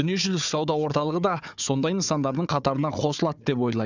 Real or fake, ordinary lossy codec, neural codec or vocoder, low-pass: fake; none; codec, 16 kHz, 4 kbps, FunCodec, trained on Chinese and English, 50 frames a second; 7.2 kHz